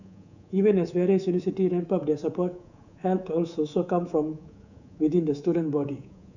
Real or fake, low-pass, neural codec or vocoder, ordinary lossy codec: fake; 7.2 kHz; codec, 24 kHz, 3.1 kbps, DualCodec; none